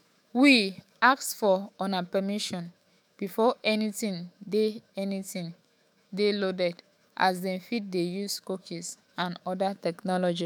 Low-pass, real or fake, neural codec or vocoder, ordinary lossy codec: none; fake; autoencoder, 48 kHz, 128 numbers a frame, DAC-VAE, trained on Japanese speech; none